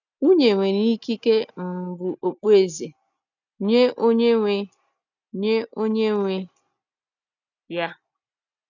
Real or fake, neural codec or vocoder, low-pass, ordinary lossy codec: real; none; 7.2 kHz; none